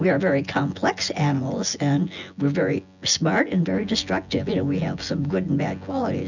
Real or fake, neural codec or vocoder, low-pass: fake; vocoder, 24 kHz, 100 mel bands, Vocos; 7.2 kHz